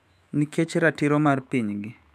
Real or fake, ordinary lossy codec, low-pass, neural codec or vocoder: fake; none; 14.4 kHz; autoencoder, 48 kHz, 128 numbers a frame, DAC-VAE, trained on Japanese speech